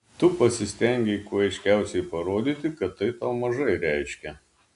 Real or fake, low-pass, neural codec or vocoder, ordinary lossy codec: real; 10.8 kHz; none; AAC, 96 kbps